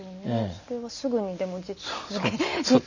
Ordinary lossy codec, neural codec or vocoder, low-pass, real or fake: none; none; 7.2 kHz; real